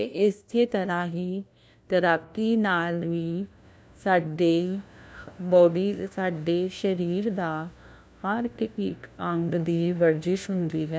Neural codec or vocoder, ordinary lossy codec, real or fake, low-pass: codec, 16 kHz, 1 kbps, FunCodec, trained on LibriTTS, 50 frames a second; none; fake; none